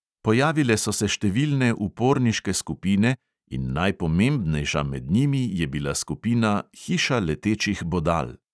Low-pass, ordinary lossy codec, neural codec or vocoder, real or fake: none; none; none; real